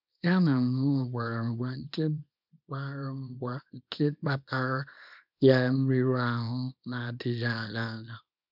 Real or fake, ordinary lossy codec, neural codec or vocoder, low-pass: fake; none; codec, 24 kHz, 0.9 kbps, WavTokenizer, small release; 5.4 kHz